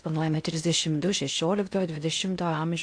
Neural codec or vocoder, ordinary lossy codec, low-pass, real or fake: codec, 16 kHz in and 24 kHz out, 0.6 kbps, FocalCodec, streaming, 4096 codes; AAC, 64 kbps; 9.9 kHz; fake